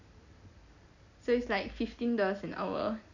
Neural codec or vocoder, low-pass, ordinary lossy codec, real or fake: none; 7.2 kHz; none; real